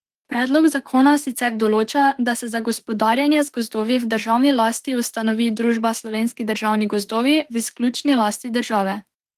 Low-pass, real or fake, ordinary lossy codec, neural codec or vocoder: 14.4 kHz; fake; Opus, 24 kbps; autoencoder, 48 kHz, 32 numbers a frame, DAC-VAE, trained on Japanese speech